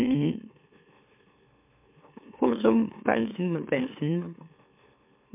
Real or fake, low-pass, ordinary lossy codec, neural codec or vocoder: fake; 3.6 kHz; MP3, 32 kbps; autoencoder, 44.1 kHz, a latent of 192 numbers a frame, MeloTTS